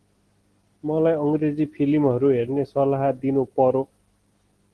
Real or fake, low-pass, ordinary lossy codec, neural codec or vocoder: real; 10.8 kHz; Opus, 16 kbps; none